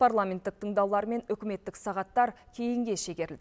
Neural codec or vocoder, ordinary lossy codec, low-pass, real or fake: none; none; none; real